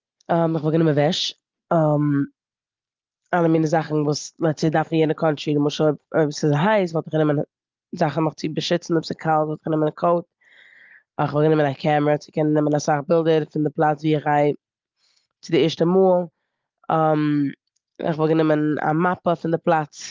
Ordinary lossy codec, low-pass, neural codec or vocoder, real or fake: Opus, 32 kbps; 7.2 kHz; none; real